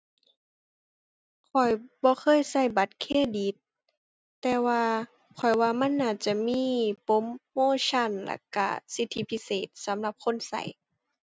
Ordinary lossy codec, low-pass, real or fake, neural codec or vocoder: none; none; real; none